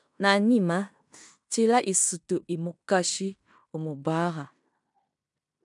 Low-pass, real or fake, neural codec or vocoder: 10.8 kHz; fake; codec, 16 kHz in and 24 kHz out, 0.9 kbps, LongCat-Audio-Codec, fine tuned four codebook decoder